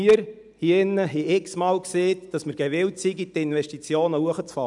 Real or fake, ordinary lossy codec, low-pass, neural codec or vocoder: real; none; 10.8 kHz; none